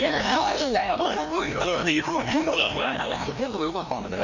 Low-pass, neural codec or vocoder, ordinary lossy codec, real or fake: 7.2 kHz; codec, 16 kHz, 1 kbps, FreqCodec, larger model; none; fake